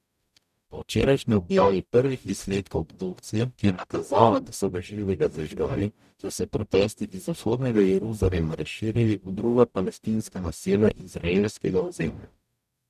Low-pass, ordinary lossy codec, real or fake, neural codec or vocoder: 14.4 kHz; none; fake; codec, 44.1 kHz, 0.9 kbps, DAC